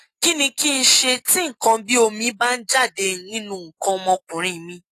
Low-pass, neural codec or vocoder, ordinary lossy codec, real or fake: 14.4 kHz; none; AAC, 48 kbps; real